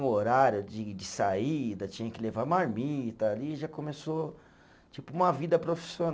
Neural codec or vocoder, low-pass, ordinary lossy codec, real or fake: none; none; none; real